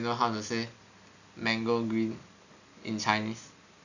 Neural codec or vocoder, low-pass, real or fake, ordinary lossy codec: none; 7.2 kHz; real; none